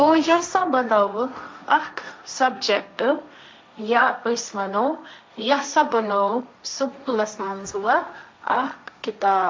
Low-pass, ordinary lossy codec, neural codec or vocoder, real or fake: none; none; codec, 16 kHz, 1.1 kbps, Voila-Tokenizer; fake